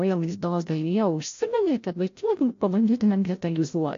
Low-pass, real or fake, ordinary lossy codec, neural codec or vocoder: 7.2 kHz; fake; AAC, 48 kbps; codec, 16 kHz, 0.5 kbps, FreqCodec, larger model